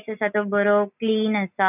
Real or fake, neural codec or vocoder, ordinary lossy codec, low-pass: real; none; none; 3.6 kHz